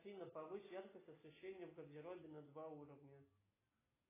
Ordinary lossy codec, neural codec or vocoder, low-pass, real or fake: AAC, 16 kbps; none; 3.6 kHz; real